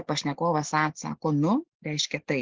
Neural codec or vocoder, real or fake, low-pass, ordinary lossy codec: none; real; 7.2 kHz; Opus, 16 kbps